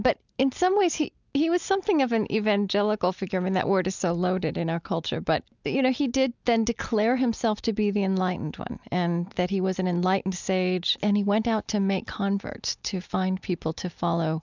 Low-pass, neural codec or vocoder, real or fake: 7.2 kHz; none; real